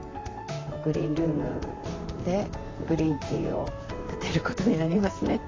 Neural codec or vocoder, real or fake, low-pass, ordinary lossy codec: vocoder, 44.1 kHz, 128 mel bands, Pupu-Vocoder; fake; 7.2 kHz; AAC, 32 kbps